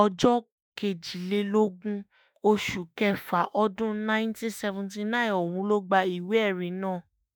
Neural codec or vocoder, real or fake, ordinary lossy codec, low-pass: autoencoder, 48 kHz, 32 numbers a frame, DAC-VAE, trained on Japanese speech; fake; none; none